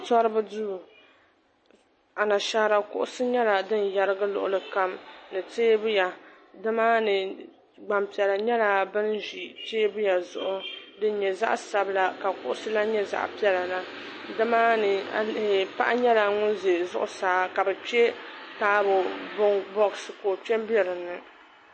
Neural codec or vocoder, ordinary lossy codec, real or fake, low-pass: none; MP3, 32 kbps; real; 9.9 kHz